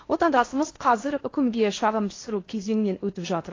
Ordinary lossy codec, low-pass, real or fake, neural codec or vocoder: AAC, 32 kbps; 7.2 kHz; fake; codec, 16 kHz in and 24 kHz out, 0.6 kbps, FocalCodec, streaming, 2048 codes